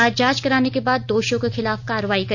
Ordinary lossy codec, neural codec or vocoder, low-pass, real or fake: none; none; 7.2 kHz; real